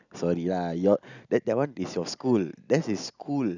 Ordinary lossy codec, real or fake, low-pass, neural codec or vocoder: none; real; 7.2 kHz; none